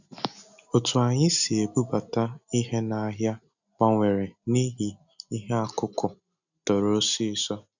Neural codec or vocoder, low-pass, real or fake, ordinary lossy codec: none; 7.2 kHz; real; none